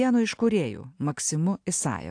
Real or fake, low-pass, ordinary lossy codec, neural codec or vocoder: real; 9.9 kHz; AAC, 64 kbps; none